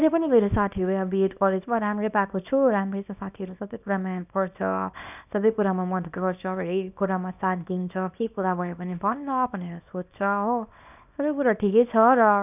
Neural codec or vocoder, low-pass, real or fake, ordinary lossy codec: codec, 24 kHz, 0.9 kbps, WavTokenizer, small release; 3.6 kHz; fake; none